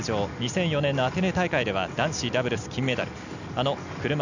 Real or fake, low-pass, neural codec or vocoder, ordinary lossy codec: real; 7.2 kHz; none; none